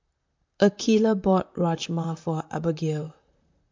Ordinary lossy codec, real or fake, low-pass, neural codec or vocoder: MP3, 64 kbps; fake; 7.2 kHz; vocoder, 44.1 kHz, 128 mel bands, Pupu-Vocoder